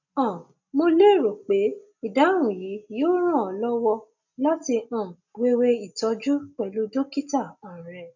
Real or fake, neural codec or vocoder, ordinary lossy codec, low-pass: real; none; none; 7.2 kHz